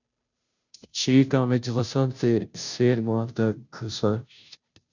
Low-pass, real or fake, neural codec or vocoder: 7.2 kHz; fake; codec, 16 kHz, 0.5 kbps, FunCodec, trained on Chinese and English, 25 frames a second